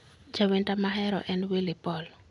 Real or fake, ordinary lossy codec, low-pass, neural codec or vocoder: real; none; 10.8 kHz; none